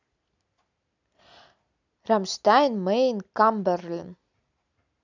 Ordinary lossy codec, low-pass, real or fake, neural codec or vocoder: none; 7.2 kHz; real; none